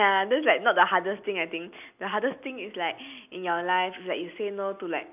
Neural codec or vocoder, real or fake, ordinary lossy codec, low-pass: none; real; none; 3.6 kHz